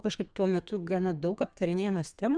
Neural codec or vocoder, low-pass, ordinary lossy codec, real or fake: codec, 32 kHz, 1.9 kbps, SNAC; 9.9 kHz; Opus, 64 kbps; fake